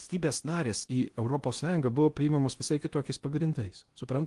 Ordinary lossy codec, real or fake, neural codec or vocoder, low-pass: Opus, 24 kbps; fake; codec, 16 kHz in and 24 kHz out, 0.8 kbps, FocalCodec, streaming, 65536 codes; 10.8 kHz